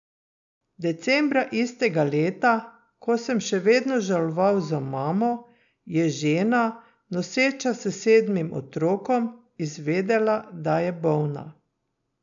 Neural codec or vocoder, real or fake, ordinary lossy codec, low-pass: none; real; none; 7.2 kHz